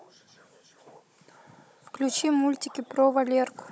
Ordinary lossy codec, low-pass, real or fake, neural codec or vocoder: none; none; fake; codec, 16 kHz, 16 kbps, FunCodec, trained on Chinese and English, 50 frames a second